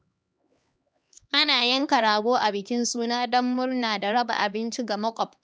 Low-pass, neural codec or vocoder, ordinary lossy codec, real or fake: none; codec, 16 kHz, 2 kbps, X-Codec, HuBERT features, trained on LibriSpeech; none; fake